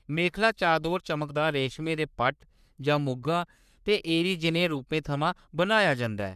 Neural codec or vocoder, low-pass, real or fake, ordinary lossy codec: codec, 44.1 kHz, 3.4 kbps, Pupu-Codec; 14.4 kHz; fake; none